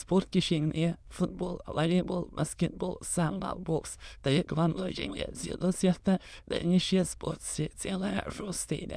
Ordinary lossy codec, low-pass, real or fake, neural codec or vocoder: none; none; fake; autoencoder, 22.05 kHz, a latent of 192 numbers a frame, VITS, trained on many speakers